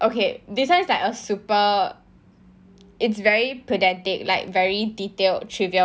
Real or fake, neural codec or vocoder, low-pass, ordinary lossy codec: real; none; none; none